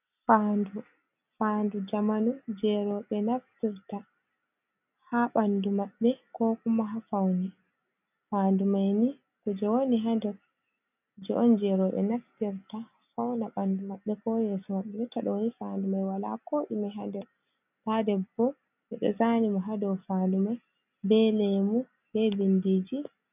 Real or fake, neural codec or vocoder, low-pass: real; none; 3.6 kHz